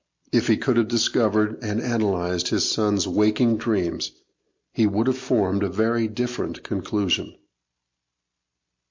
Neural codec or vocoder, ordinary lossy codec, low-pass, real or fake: none; MP3, 48 kbps; 7.2 kHz; real